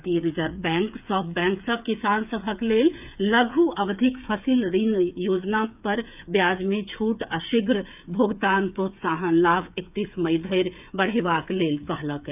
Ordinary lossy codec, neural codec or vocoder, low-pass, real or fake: none; codec, 16 kHz, 8 kbps, FreqCodec, smaller model; 3.6 kHz; fake